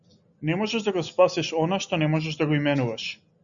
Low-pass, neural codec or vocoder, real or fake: 7.2 kHz; none; real